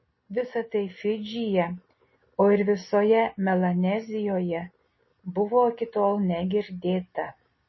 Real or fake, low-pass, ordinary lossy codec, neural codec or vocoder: real; 7.2 kHz; MP3, 24 kbps; none